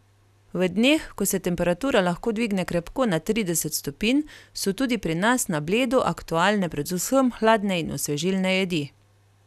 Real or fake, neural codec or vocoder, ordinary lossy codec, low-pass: real; none; none; 14.4 kHz